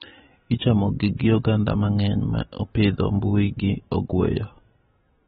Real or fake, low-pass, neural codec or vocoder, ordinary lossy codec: real; 19.8 kHz; none; AAC, 16 kbps